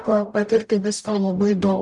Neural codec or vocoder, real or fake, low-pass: codec, 44.1 kHz, 0.9 kbps, DAC; fake; 10.8 kHz